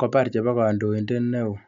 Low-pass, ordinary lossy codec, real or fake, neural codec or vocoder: 7.2 kHz; none; real; none